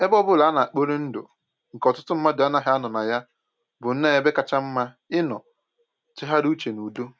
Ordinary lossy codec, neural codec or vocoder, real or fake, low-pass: none; none; real; none